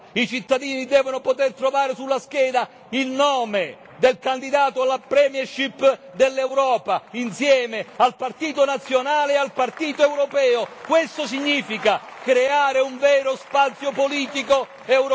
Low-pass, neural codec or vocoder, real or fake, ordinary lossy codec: none; none; real; none